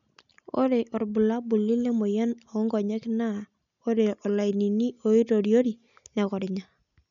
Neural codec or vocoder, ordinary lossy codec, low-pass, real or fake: none; none; 7.2 kHz; real